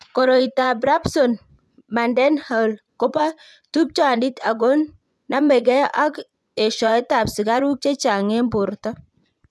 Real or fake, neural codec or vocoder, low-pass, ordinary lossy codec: fake; vocoder, 24 kHz, 100 mel bands, Vocos; none; none